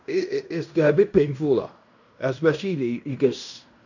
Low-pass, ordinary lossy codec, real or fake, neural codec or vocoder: 7.2 kHz; none; fake; codec, 16 kHz in and 24 kHz out, 0.9 kbps, LongCat-Audio-Codec, fine tuned four codebook decoder